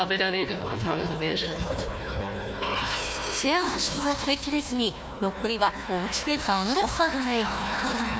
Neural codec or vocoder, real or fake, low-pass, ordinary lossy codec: codec, 16 kHz, 1 kbps, FunCodec, trained on Chinese and English, 50 frames a second; fake; none; none